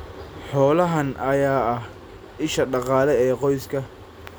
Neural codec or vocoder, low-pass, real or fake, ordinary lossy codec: none; none; real; none